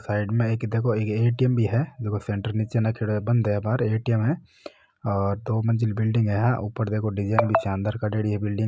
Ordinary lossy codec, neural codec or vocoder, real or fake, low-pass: none; none; real; none